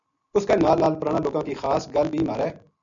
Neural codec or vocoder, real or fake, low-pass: none; real; 7.2 kHz